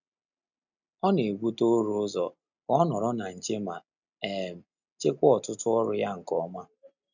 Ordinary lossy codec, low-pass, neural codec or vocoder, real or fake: none; 7.2 kHz; none; real